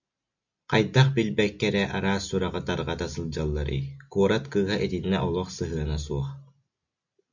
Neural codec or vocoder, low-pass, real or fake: none; 7.2 kHz; real